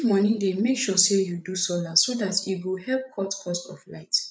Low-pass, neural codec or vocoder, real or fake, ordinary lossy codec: none; codec, 16 kHz, 8 kbps, FreqCodec, larger model; fake; none